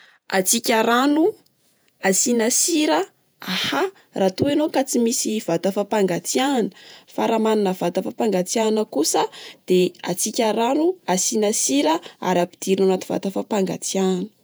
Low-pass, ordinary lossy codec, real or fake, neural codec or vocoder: none; none; fake; vocoder, 48 kHz, 128 mel bands, Vocos